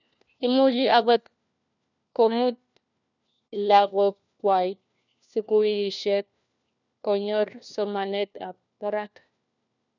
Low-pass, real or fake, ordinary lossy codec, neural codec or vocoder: 7.2 kHz; fake; none; codec, 16 kHz, 1 kbps, FunCodec, trained on LibriTTS, 50 frames a second